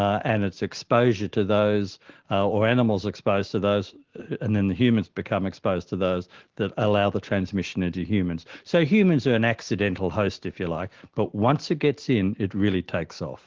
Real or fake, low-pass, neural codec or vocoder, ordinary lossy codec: real; 7.2 kHz; none; Opus, 16 kbps